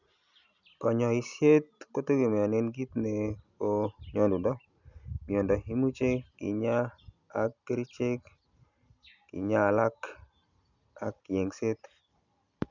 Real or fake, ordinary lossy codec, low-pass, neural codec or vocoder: real; none; 7.2 kHz; none